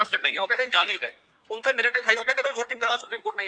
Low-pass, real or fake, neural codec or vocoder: 9.9 kHz; fake; codec, 24 kHz, 1 kbps, SNAC